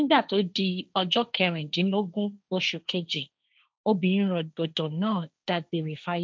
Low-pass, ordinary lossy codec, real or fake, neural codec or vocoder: none; none; fake; codec, 16 kHz, 1.1 kbps, Voila-Tokenizer